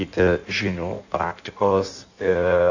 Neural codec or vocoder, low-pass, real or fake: codec, 16 kHz in and 24 kHz out, 1.1 kbps, FireRedTTS-2 codec; 7.2 kHz; fake